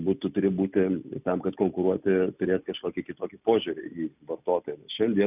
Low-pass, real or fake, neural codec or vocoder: 3.6 kHz; real; none